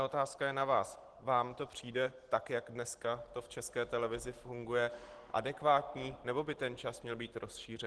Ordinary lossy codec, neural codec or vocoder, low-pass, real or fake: Opus, 16 kbps; none; 10.8 kHz; real